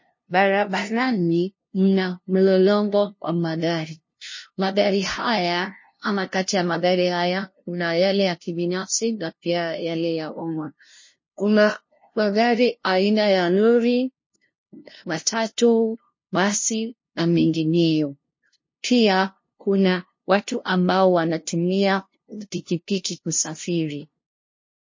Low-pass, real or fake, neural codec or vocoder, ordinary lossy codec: 7.2 kHz; fake; codec, 16 kHz, 0.5 kbps, FunCodec, trained on LibriTTS, 25 frames a second; MP3, 32 kbps